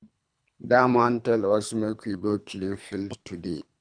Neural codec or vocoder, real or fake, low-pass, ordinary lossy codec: codec, 24 kHz, 3 kbps, HILCodec; fake; 9.9 kHz; none